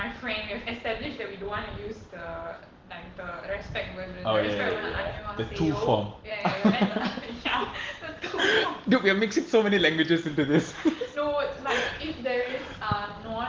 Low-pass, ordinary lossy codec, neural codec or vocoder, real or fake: 7.2 kHz; Opus, 16 kbps; none; real